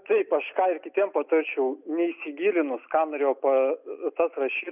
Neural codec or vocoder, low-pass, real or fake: none; 3.6 kHz; real